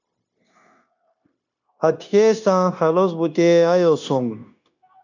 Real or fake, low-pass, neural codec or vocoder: fake; 7.2 kHz; codec, 16 kHz, 0.9 kbps, LongCat-Audio-Codec